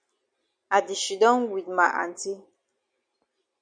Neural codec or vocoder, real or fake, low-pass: none; real; 9.9 kHz